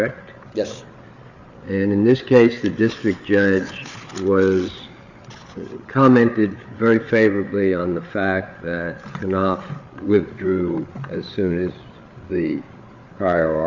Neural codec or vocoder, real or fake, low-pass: codec, 16 kHz, 8 kbps, FreqCodec, larger model; fake; 7.2 kHz